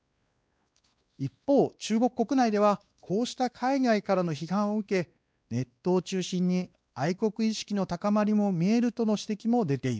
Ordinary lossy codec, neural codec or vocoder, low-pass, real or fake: none; codec, 16 kHz, 2 kbps, X-Codec, WavLM features, trained on Multilingual LibriSpeech; none; fake